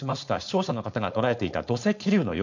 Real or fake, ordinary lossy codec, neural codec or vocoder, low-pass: fake; none; codec, 16 kHz, 4.8 kbps, FACodec; 7.2 kHz